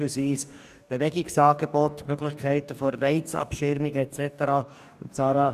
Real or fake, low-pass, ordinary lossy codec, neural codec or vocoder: fake; 14.4 kHz; none; codec, 44.1 kHz, 2.6 kbps, DAC